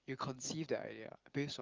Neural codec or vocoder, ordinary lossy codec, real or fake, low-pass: none; Opus, 32 kbps; real; 7.2 kHz